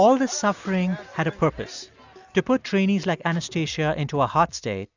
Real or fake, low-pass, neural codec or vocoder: real; 7.2 kHz; none